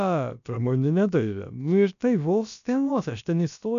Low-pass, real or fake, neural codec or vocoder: 7.2 kHz; fake; codec, 16 kHz, about 1 kbps, DyCAST, with the encoder's durations